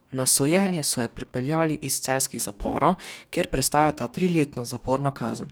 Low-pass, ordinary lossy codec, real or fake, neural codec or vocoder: none; none; fake; codec, 44.1 kHz, 2.6 kbps, DAC